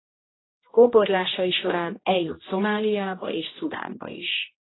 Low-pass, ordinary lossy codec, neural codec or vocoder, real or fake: 7.2 kHz; AAC, 16 kbps; codec, 16 kHz, 1 kbps, X-Codec, HuBERT features, trained on general audio; fake